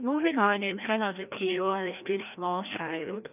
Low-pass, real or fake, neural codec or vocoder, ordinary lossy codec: 3.6 kHz; fake; codec, 16 kHz, 1 kbps, FreqCodec, larger model; none